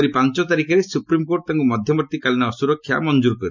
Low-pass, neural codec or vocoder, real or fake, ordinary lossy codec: 7.2 kHz; none; real; none